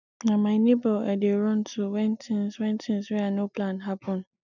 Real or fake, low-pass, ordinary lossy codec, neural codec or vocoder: real; 7.2 kHz; none; none